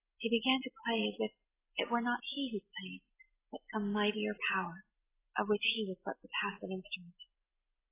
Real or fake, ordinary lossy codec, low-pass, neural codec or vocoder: real; AAC, 16 kbps; 3.6 kHz; none